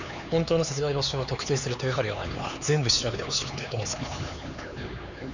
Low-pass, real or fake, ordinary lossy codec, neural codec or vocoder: 7.2 kHz; fake; none; codec, 16 kHz, 4 kbps, X-Codec, HuBERT features, trained on LibriSpeech